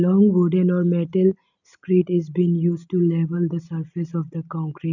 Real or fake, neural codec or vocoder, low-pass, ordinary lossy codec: real; none; 7.2 kHz; none